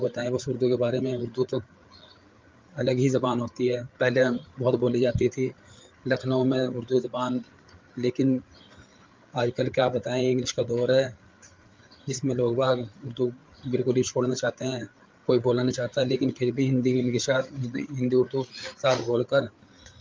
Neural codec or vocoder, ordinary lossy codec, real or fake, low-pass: codec, 16 kHz, 8 kbps, FreqCodec, larger model; Opus, 32 kbps; fake; 7.2 kHz